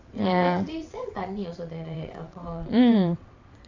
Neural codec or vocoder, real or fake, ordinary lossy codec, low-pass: vocoder, 22.05 kHz, 80 mel bands, WaveNeXt; fake; AAC, 48 kbps; 7.2 kHz